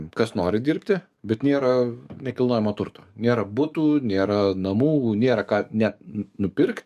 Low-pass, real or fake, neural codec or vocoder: 14.4 kHz; fake; codec, 44.1 kHz, 7.8 kbps, DAC